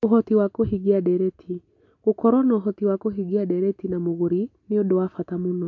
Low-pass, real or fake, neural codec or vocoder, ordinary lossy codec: 7.2 kHz; real; none; MP3, 48 kbps